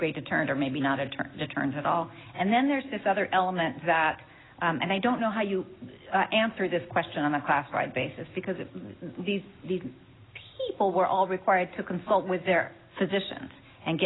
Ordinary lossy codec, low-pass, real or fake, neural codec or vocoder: AAC, 16 kbps; 7.2 kHz; real; none